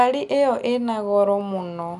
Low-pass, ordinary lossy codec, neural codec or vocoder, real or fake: 10.8 kHz; none; none; real